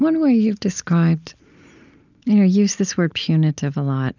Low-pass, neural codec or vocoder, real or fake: 7.2 kHz; none; real